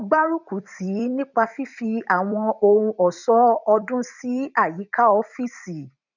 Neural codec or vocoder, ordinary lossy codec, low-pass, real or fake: vocoder, 44.1 kHz, 128 mel bands every 256 samples, BigVGAN v2; none; 7.2 kHz; fake